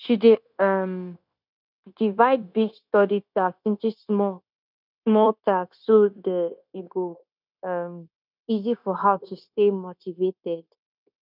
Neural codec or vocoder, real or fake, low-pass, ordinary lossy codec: codec, 16 kHz, 0.9 kbps, LongCat-Audio-Codec; fake; 5.4 kHz; none